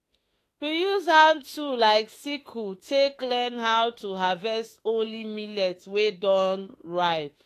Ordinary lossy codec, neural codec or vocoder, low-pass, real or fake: AAC, 48 kbps; autoencoder, 48 kHz, 32 numbers a frame, DAC-VAE, trained on Japanese speech; 14.4 kHz; fake